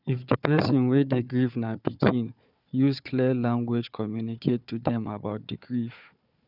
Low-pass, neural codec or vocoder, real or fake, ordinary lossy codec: 5.4 kHz; codec, 16 kHz, 4 kbps, FunCodec, trained on Chinese and English, 50 frames a second; fake; none